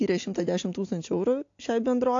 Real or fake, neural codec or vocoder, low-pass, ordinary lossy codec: real; none; 7.2 kHz; AAC, 48 kbps